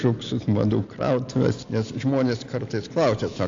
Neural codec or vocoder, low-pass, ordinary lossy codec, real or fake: none; 7.2 kHz; AAC, 64 kbps; real